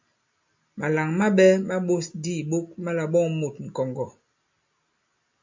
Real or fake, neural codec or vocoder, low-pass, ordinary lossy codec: real; none; 7.2 kHz; MP3, 48 kbps